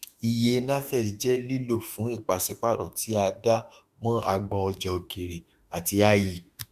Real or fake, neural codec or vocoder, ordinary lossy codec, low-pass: fake; autoencoder, 48 kHz, 32 numbers a frame, DAC-VAE, trained on Japanese speech; Opus, 64 kbps; 14.4 kHz